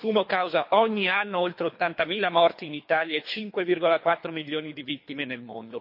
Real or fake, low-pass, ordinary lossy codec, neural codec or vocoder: fake; 5.4 kHz; MP3, 32 kbps; codec, 24 kHz, 3 kbps, HILCodec